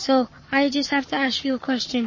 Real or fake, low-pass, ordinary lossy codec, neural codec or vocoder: fake; 7.2 kHz; MP3, 32 kbps; codec, 44.1 kHz, 7.8 kbps, Pupu-Codec